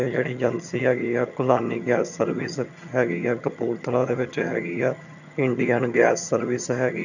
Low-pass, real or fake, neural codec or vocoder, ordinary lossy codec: 7.2 kHz; fake; vocoder, 22.05 kHz, 80 mel bands, HiFi-GAN; none